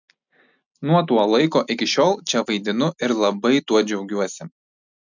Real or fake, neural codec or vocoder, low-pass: real; none; 7.2 kHz